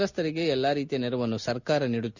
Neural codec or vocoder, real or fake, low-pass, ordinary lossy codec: none; real; 7.2 kHz; none